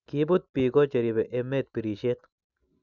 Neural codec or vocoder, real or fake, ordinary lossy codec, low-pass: none; real; none; 7.2 kHz